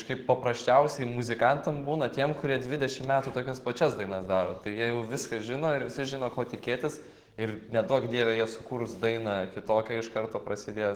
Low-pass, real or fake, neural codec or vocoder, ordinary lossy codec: 19.8 kHz; fake; codec, 44.1 kHz, 7.8 kbps, DAC; Opus, 16 kbps